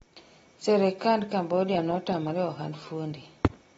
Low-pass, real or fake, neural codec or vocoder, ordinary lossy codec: 14.4 kHz; real; none; AAC, 24 kbps